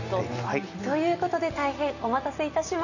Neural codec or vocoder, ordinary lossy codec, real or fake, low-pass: none; none; real; 7.2 kHz